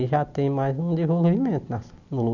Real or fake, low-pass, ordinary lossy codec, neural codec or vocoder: real; 7.2 kHz; none; none